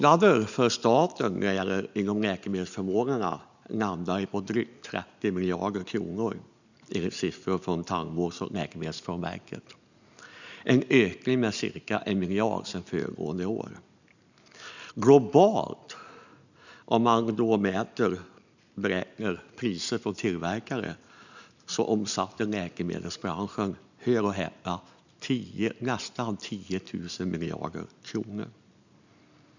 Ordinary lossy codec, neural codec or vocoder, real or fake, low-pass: none; none; real; 7.2 kHz